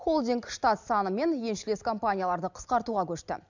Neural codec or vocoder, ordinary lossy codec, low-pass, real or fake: none; none; 7.2 kHz; real